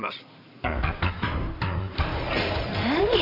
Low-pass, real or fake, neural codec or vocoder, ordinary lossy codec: 5.4 kHz; fake; codec, 16 kHz in and 24 kHz out, 2.2 kbps, FireRedTTS-2 codec; none